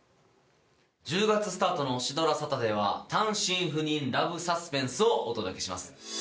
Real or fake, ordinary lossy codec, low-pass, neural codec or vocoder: real; none; none; none